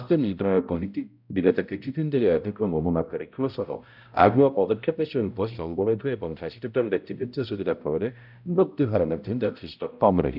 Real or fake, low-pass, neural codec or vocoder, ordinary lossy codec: fake; 5.4 kHz; codec, 16 kHz, 0.5 kbps, X-Codec, HuBERT features, trained on balanced general audio; none